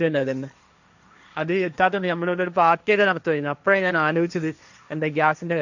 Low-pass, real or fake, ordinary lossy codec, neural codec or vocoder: 7.2 kHz; fake; none; codec, 16 kHz, 1.1 kbps, Voila-Tokenizer